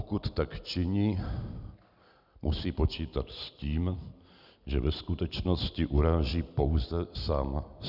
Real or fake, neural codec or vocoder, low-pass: real; none; 5.4 kHz